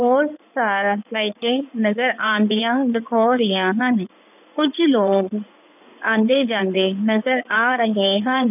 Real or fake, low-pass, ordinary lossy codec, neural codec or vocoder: fake; 3.6 kHz; none; codec, 16 kHz in and 24 kHz out, 2.2 kbps, FireRedTTS-2 codec